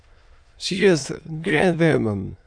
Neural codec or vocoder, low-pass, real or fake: autoencoder, 22.05 kHz, a latent of 192 numbers a frame, VITS, trained on many speakers; 9.9 kHz; fake